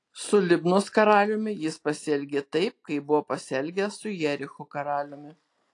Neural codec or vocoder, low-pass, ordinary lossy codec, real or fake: none; 10.8 kHz; AAC, 48 kbps; real